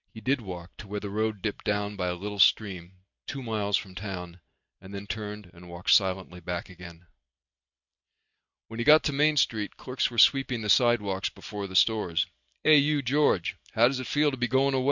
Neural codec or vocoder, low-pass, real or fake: none; 7.2 kHz; real